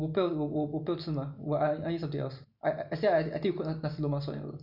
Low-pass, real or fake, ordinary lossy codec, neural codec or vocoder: 5.4 kHz; real; none; none